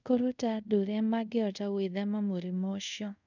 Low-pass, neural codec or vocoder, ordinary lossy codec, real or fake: 7.2 kHz; codec, 24 kHz, 0.5 kbps, DualCodec; none; fake